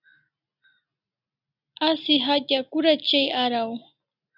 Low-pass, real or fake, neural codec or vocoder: 5.4 kHz; real; none